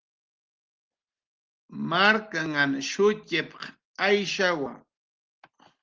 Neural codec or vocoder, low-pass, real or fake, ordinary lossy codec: none; 7.2 kHz; real; Opus, 16 kbps